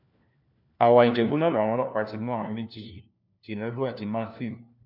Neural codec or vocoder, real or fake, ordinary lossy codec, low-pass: codec, 16 kHz, 1 kbps, FunCodec, trained on LibriTTS, 50 frames a second; fake; none; 5.4 kHz